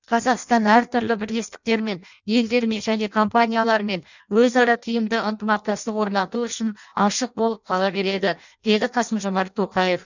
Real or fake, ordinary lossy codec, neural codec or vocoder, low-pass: fake; none; codec, 16 kHz in and 24 kHz out, 0.6 kbps, FireRedTTS-2 codec; 7.2 kHz